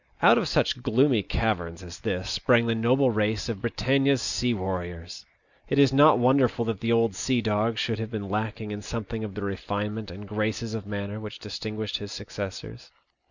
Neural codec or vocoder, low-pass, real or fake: none; 7.2 kHz; real